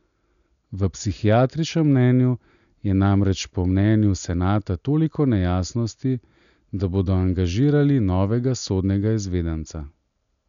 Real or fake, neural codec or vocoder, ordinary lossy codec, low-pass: real; none; none; 7.2 kHz